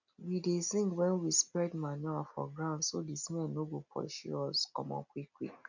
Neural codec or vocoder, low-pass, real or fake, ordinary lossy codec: none; 7.2 kHz; real; none